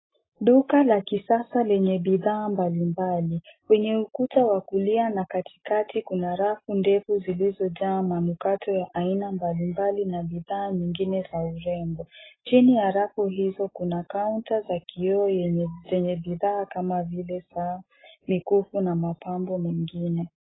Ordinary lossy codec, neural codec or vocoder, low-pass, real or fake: AAC, 16 kbps; none; 7.2 kHz; real